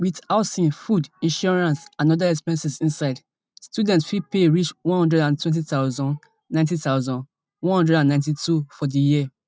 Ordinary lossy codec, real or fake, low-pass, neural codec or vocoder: none; real; none; none